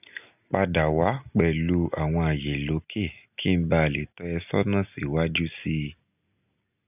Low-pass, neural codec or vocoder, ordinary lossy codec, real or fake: 3.6 kHz; none; none; real